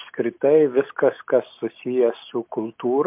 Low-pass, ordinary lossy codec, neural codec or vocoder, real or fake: 3.6 kHz; MP3, 32 kbps; none; real